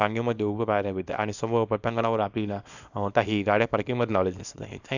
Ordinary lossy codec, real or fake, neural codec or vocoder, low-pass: none; fake; codec, 24 kHz, 0.9 kbps, WavTokenizer, small release; 7.2 kHz